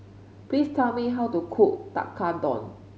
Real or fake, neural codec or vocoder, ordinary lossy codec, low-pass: real; none; none; none